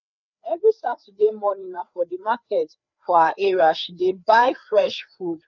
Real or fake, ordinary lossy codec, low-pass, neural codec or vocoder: fake; none; 7.2 kHz; codec, 16 kHz, 4 kbps, FreqCodec, larger model